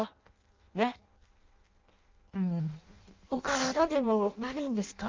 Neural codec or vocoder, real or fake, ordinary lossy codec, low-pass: codec, 16 kHz in and 24 kHz out, 0.6 kbps, FireRedTTS-2 codec; fake; Opus, 24 kbps; 7.2 kHz